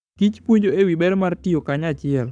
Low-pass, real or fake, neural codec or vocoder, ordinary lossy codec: 9.9 kHz; fake; codec, 44.1 kHz, 7.8 kbps, Pupu-Codec; none